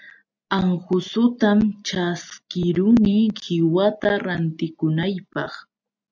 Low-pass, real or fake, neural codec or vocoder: 7.2 kHz; real; none